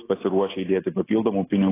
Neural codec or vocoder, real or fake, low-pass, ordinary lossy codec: none; real; 3.6 kHz; AAC, 16 kbps